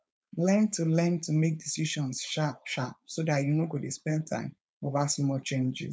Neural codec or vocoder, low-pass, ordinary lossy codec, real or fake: codec, 16 kHz, 4.8 kbps, FACodec; none; none; fake